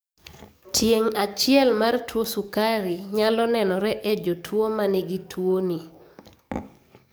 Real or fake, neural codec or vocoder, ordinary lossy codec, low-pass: fake; codec, 44.1 kHz, 7.8 kbps, DAC; none; none